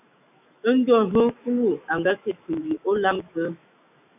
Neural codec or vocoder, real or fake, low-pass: autoencoder, 48 kHz, 128 numbers a frame, DAC-VAE, trained on Japanese speech; fake; 3.6 kHz